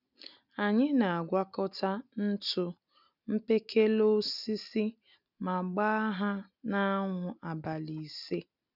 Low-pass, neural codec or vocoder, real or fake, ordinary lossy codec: 5.4 kHz; none; real; none